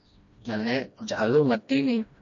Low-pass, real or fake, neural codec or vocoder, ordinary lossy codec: 7.2 kHz; fake; codec, 16 kHz, 1 kbps, FreqCodec, smaller model; MP3, 48 kbps